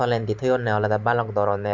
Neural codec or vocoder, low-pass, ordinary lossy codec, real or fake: vocoder, 44.1 kHz, 128 mel bands every 512 samples, BigVGAN v2; 7.2 kHz; MP3, 64 kbps; fake